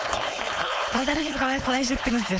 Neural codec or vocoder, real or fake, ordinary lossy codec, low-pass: codec, 16 kHz, 4.8 kbps, FACodec; fake; none; none